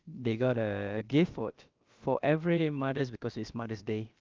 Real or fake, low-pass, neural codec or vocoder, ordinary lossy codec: fake; 7.2 kHz; codec, 16 kHz, about 1 kbps, DyCAST, with the encoder's durations; Opus, 32 kbps